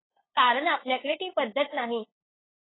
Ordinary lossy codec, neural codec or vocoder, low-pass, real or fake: AAC, 16 kbps; codec, 16 kHz, 8 kbps, FunCodec, trained on LibriTTS, 25 frames a second; 7.2 kHz; fake